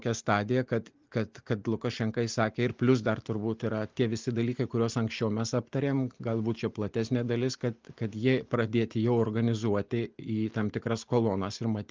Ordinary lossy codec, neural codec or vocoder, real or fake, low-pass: Opus, 24 kbps; none; real; 7.2 kHz